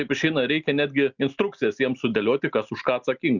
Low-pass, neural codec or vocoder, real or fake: 7.2 kHz; none; real